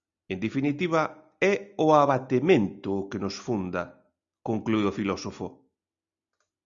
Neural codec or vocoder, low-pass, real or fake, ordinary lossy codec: none; 7.2 kHz; real; Opus, 64 kbps